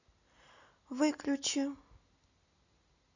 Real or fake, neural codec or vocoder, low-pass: real; none; 7.2 kHz